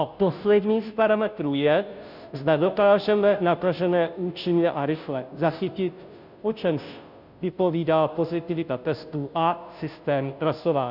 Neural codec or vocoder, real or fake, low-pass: codec, 16 kHz, 0.5 kbps, FunCodec, trained on Chinese and English, 25 frames a second; fake; 5.4 kHz